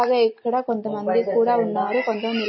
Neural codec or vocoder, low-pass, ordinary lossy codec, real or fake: none; 7.2 kHz; MP3, 24 kbps; real